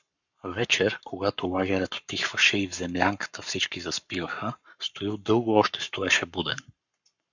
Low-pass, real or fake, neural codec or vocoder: 7.2 kHz; fake; codec, 44.1 kHz, 7.8 kbps, Pupu-Codec